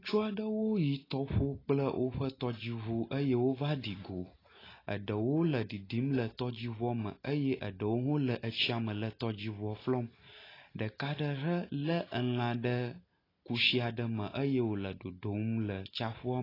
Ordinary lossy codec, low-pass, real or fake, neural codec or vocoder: AAC, 24 kbps; 5.4 kHz; real; none